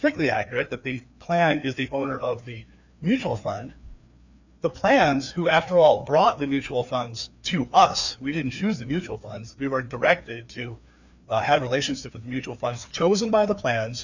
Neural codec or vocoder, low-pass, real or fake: codec, 16 kHz, 2 kbps, FreqCodec, larger model; 7.2 kHz; fake